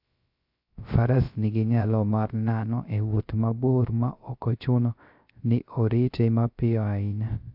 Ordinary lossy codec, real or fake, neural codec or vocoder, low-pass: AAC, 48 kbps; fake; codec, 16 kHz, 0.3 kbps, FocalCodec; 5.4 kHz